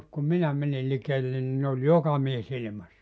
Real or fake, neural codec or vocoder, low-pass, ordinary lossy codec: real; none; none; none